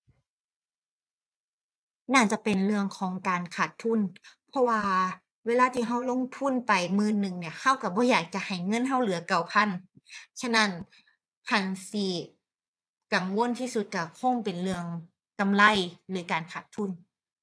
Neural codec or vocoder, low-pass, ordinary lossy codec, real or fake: vocoder, 22.05 kHz, 80 mel bands, Vocos; none; none; fake